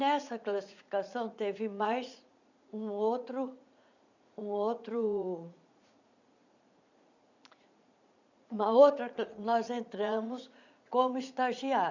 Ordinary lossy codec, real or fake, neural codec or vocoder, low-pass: none; fake; vocoder, 44.1 kHz, 80 mel bands, Vocos; 7.2 kHz